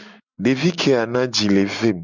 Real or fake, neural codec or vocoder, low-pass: real; none; 7.2 kHz